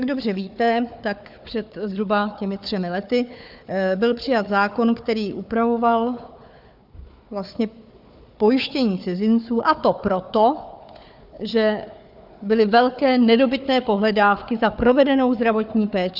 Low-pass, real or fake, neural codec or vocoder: 5.4 kHz; fake; codec, 16 kHz, 4 kbps, FunCodec, trained on Chinese and English, 50 frames a second